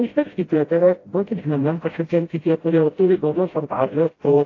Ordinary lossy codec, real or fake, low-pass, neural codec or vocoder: AAC, 32 kbps; fake; 7.2 kHz; codec, 16 kHz, 0.5 kbps, FreqCodec, smaller model